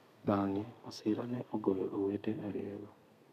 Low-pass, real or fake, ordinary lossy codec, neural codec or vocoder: 14.4 kHz; fake; none; codec, 32 kHz, 1.9 kbps, SNAC